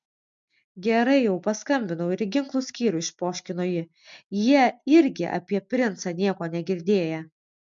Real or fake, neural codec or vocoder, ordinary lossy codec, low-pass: real; none; MP3, 64 kbps; 7.2 kHz